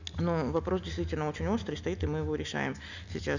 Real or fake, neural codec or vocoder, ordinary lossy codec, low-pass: real; none; none; 7.2 kHz